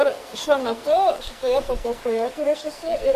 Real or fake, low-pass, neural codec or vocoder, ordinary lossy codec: fake; 14.4 kHz; codec, 44.1 kHz, 2.6 kbps, SNAC; MP3, 96 kbps